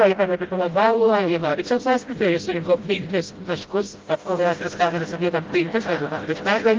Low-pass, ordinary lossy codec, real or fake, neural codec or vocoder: 7.2 kHz; Opus, 32 kbps; fake; codec, 16 kHz, 0.5 kbps, FreqCodec, smaller model